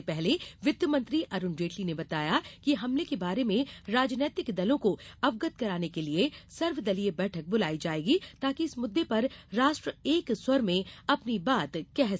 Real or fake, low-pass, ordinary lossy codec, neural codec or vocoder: real; none; none; none